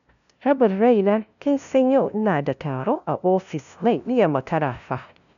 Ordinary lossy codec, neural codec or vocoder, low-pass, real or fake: none; codec, 16 kHz, 0.5 kbps, FunCodec, trained on LibriTTS, 25 frames a second; 7.2 kHz; fake